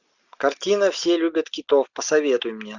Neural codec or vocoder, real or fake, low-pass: none; real; 7.2 kHz